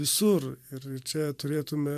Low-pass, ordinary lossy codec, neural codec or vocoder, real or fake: 14.4 kHz; MP3, 96 kbps; vocoder, 44.1 kHz, 128 mel bands every 512 samples, BigVGAN v2; fake